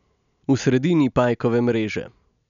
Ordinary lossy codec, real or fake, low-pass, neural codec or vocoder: none; real; 7.2 kHz; none